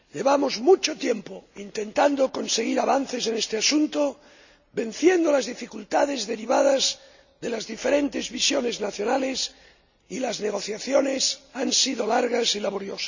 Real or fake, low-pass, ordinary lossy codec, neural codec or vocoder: real; 7.2 kHz; none; none